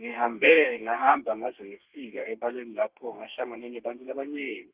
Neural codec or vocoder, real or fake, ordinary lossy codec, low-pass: codec, 32 kHz, 1.9 kbps, SNAC; fake; Opus, 32 kbps; 3.6 kHz